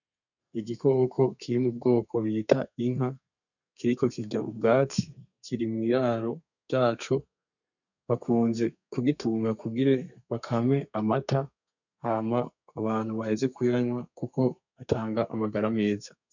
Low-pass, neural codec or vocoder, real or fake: 7.2 kHz; codec, 32 kHz, 1.9 kbps, SNAC; fake